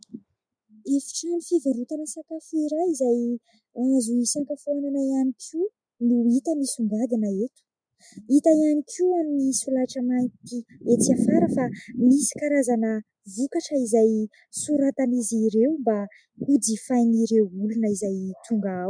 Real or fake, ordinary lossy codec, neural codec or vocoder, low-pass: real; MP3, 96 kbps; none; 9.9 kHz